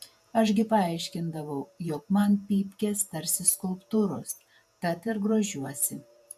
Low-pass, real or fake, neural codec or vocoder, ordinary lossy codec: 14.4 kHz; fake; vocoder, 48 kHz, 128 mel bands, Vocos; AAC, 96 kbps